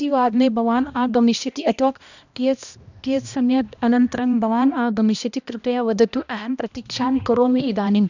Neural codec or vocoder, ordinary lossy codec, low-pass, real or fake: codec, 16 kHz, 1 kbps, X-Codec, HuBERT features, trained on balanced general audio; none; 7.2 kHz; fake